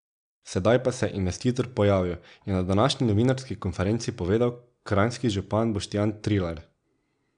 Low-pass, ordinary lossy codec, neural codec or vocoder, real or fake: 9.9 kHz; none; none; real